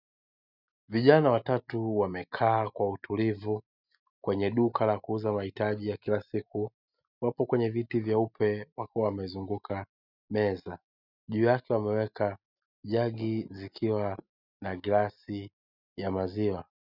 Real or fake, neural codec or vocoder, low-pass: real; none; 5.4 kHz